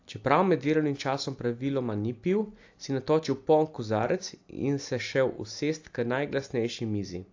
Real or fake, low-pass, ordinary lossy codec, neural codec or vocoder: real; 7.2 kHz; AAC, 48 kbps; none